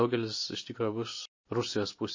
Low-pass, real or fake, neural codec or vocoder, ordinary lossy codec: 7.2 kHz; real; none; MP3, 32 kbps